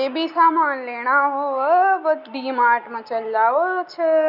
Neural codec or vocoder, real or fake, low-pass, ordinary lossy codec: none; real; 5.4 kHz; none